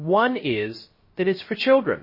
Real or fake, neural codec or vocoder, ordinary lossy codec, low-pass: fake; codec, 16 kHz, 0.3 kbps, FocalCodec; MP3, 24 kbps; 5.4 kHz